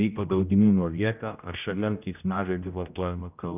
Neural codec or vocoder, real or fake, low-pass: codec, 16 kHz, 0.5 kbps, X-Codec, HuBERT features, trained on general audio; fake; 3.6 kHz